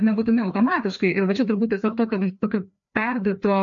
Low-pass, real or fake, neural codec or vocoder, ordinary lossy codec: 7.2 kHz; fake; codec, 16 kHz, 2 kbps, FreqCodec, larger model; MP3, 48 kbps